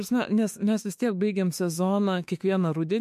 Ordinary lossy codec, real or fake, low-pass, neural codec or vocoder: MP3, 64 kbps; fake; 14.4 kHz; autoencoder, 48 kHz, 32 numbers a frame, DAC-VAE, trained on Japanese speech